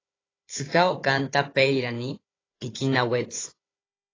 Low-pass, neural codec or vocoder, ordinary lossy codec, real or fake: 7.2 kHz; codec, 16 kHz, 4 kbps, FunCodec, trained on Chinese and English, 50 frames a second; AAC, 32 kbps; fake